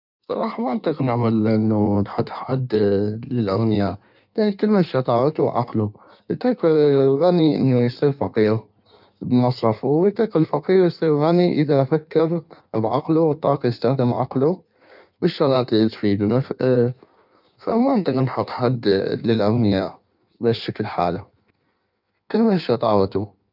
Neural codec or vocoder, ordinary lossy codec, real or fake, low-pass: codec, 16 kHz in and 24 kHz out, 1.1 kbps, FireRedTTS-2 codec; none; fake; 5.4 kHz